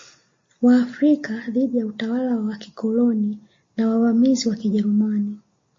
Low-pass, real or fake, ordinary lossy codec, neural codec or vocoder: 7.2 kHz; real; MP3, 32 kbps; none